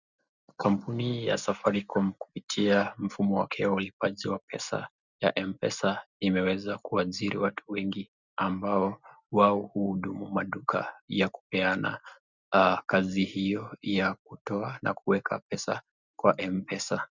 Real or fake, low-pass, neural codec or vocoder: real; 7.2 kHz; none